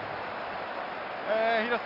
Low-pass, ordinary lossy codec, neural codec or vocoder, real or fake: 5.4 kHz; none; none; real